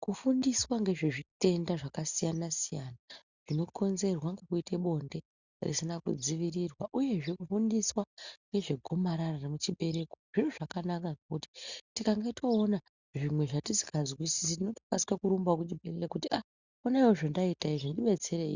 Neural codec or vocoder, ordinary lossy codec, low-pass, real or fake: none; Opus, 64 kbps; 7.2 kHz; real